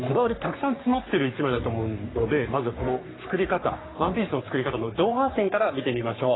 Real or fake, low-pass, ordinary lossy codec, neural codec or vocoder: fake; 7.2 kHz; AAC, 16 kbps; codec, 44.1 kHz, 3.4 kbps, Pupu-Codec